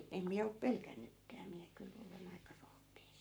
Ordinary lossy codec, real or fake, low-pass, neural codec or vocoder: none; fake; none; codec, 44.1 kHz, 7.8 kbps, Pupu-Codec